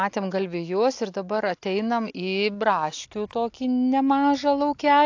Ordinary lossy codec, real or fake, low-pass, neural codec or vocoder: AAC, 48 kbps; real; 7.2 kHz; none